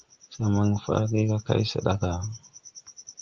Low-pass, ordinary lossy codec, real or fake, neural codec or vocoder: 7.2 kHz; Opus, 32 kbps; real; none